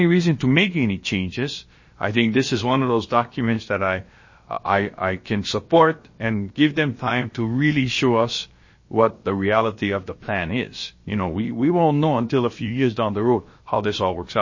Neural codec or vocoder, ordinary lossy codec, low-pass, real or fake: codec, 16 kHz, about 1 kbps, DyCAST, with the encoder's durations; MP3, 32 kbps; 7.2 kHz; fake